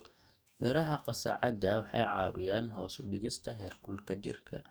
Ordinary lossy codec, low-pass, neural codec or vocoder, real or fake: none; none; codec, 44.1 kHz, 2.6 kbps, DAC; fake